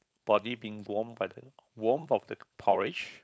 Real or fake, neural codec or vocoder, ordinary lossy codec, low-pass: fake; codec, 16 kHz, 4.8 kbps, FACodec; none; none